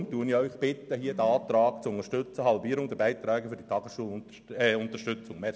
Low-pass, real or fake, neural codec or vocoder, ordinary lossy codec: none; real; none; none